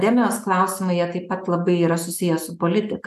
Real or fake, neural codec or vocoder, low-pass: real; none; 14.4 kHz